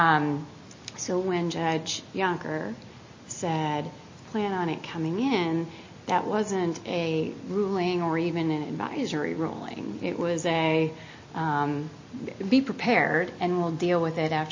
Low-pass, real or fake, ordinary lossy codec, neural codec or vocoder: 7.2 kHz; real; MP3, 32 kbps; none